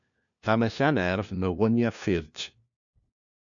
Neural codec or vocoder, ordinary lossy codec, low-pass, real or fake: codec, 16 kHz, 1 kbps, FunCodec, trained on LibriTTS, 50 frames a second; MP3, 96 kbps; 7.2 kHz; fake